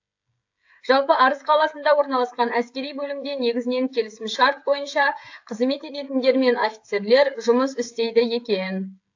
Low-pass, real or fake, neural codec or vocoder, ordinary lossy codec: 7.2 kHz; fake; codec, 16 kHz, 16 kbps, FreqCodec, smaller model; AAC, 48 kbps